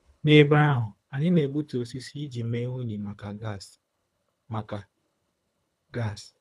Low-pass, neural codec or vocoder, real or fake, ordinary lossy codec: none; codec, 24 kHz, 3 kbps, HILCodec; fake; none